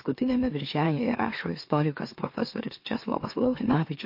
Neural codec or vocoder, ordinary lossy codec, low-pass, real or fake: autoencoder, 44.1 kHz, a latent of 192 numbers a frame, MeloTTS; MP3, 32 kbps; 5.4 kHz; fake